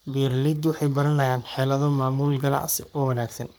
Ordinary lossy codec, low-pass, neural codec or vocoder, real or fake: none; none; codec, 44.1 kHz, 3.4 kbps, Pupu-Codec; fake